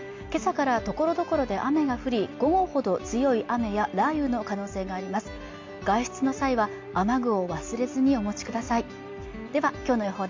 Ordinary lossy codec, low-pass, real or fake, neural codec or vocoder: MP3, 48 kbps; 7.2 kHz; real; none